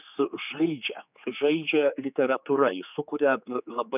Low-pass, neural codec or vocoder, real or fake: 3.6 kHz; codec, 16 kHz, 4 kbps, X-Codec, HuBERT features, trained on general audio; fake